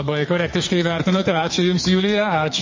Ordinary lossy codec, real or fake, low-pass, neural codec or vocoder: MP3, 32 kbps; fake; 7.2 kHz; codec, 44.1 kHz, 2.6 kbps, SNAC